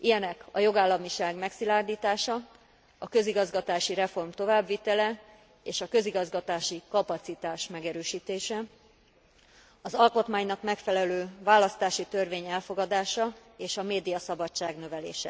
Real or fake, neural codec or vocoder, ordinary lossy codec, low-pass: real; none; none; none